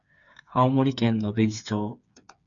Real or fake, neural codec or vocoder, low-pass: fake; codec, 16 kHz, 4 kbps, FreqCodec, smaller model; 7.2 kHz